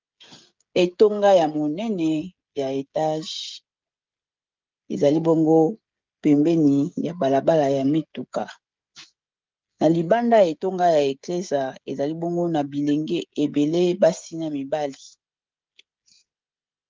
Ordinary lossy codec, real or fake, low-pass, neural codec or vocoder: Opus, 32 kbps; fake; 7.2 kHz; codec, 16 kHz, 16 kbps, FreqCodec, smaller model